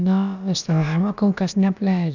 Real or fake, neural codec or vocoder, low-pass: fake; codec, 16 kHz, about 1 kbps, DyCAST, with the encoder's durations; 7.2 kHz